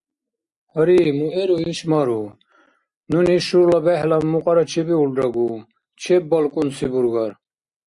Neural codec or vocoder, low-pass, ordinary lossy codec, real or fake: none; 10.8 kHz; Opus, 64 kbps; real